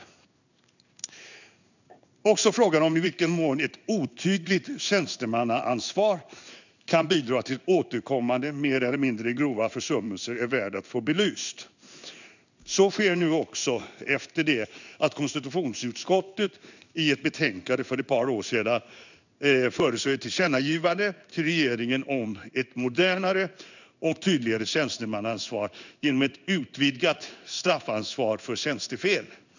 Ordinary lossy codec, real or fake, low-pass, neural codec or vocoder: none; fake; 7.2 kHz; codec, 16 kHz in and 24 kHz out, 1 kbps, XY-Tokenizer